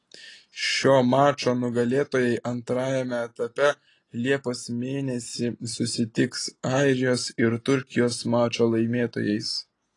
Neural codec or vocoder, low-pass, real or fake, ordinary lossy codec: none; 10.8 kHz; real; AAC, 32 kbps